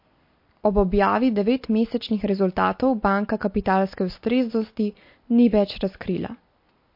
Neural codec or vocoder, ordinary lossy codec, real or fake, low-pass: none; MP3, 32 kbps; real; 5.4 kHz